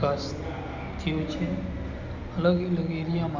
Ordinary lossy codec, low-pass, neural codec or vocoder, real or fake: none; 7.2 kHz; none; real